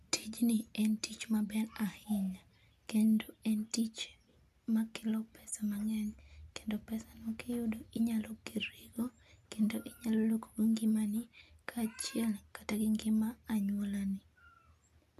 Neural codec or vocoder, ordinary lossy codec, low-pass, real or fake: none; none; 14.4 kHz; real